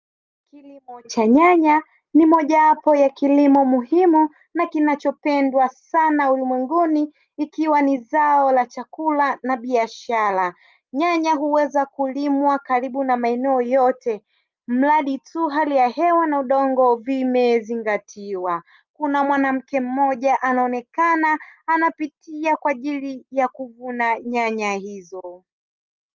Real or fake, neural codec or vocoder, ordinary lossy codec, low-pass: real; none; Opus, 32 kbps; 7.2 kHz